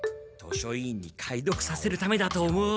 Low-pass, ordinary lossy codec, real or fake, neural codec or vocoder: none; none; real; none